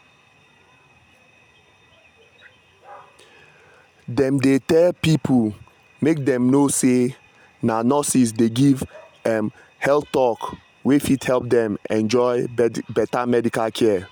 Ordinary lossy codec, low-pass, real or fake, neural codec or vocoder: none; 19.8 kHz; real; none